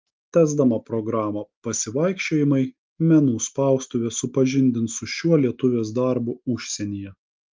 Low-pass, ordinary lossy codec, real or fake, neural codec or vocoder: 7.2 kHz; Opus, 24 kbps; real; none